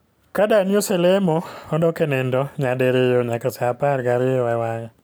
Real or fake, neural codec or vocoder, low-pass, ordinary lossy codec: real; none; none; none